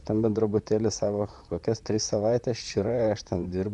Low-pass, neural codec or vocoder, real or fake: 10.8 kHz; vocoder, 44.1 kHz, 128 mel bands, Pupu-Vocoder; fake